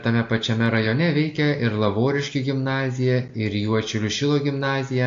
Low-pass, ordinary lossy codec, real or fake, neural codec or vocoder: 7.2 kHz; AAC, 48 kbps; real; none